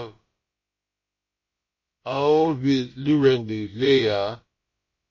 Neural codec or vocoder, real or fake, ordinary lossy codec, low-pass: codec, 16 kHz, about 1 kbps, DyCAST, with the encoder's durations; fake; MP3, 32 kbps; 7.2 kHz